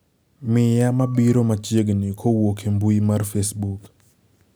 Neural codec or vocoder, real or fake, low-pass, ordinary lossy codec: none; real; none; none